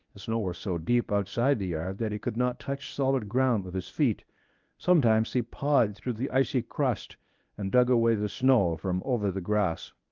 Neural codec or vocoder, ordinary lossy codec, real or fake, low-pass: codec, 24 kHz, 0.9 kbps, WavTokenizer, medium speech release version 1; Opus, 24 kbps; fake; 7.2 kHz